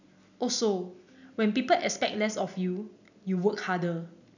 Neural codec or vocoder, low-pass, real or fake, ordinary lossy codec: none; 7.2 kHz; real; none